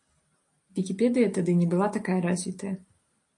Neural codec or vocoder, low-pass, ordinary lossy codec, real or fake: none; 10.8 kHz; AAC, 48 kbps; real